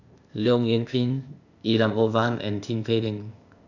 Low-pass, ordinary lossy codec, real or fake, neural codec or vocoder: 7.2 kHz; none; fake; codec, 16 kHz, 0.8 kbps, ZipCodec